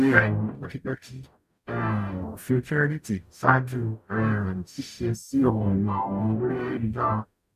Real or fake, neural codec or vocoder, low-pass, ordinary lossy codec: fake; codec, 44.1 kHz, 0.9 kbps, DAC; 14.4 kHz; none